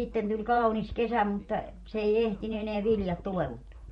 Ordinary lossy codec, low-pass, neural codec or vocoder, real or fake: MP3, 48 kbps; 19.8 kHz; vocoder, 44.1 kHz, 128 mel bands every 512 samples, BigVGAN v2; fake